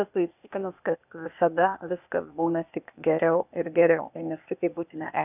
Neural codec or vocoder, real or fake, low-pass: codec, 16 kHz, 0.8 kbps, ZipCodec; fake; 3.6 kHz